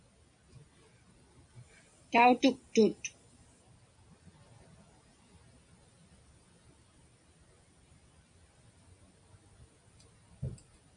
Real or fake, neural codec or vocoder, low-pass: real; none; 9.9 kHz